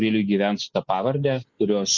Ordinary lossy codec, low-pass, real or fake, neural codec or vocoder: Opus, 64 kbps; 7.2 kHz; real; none